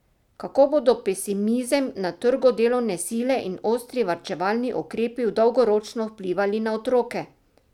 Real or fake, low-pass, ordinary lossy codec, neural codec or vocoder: real; 19.8 kHz; none; none